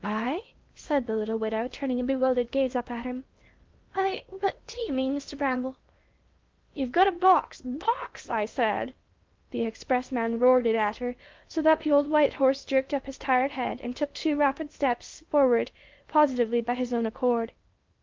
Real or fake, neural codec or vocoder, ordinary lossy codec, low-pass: fake; codec, 16 kHz in and 24 kHz out, 0.8 kbps, FocalCodec, streaming, 65536 codes; Opus, 32 kbps; 7.2 kHz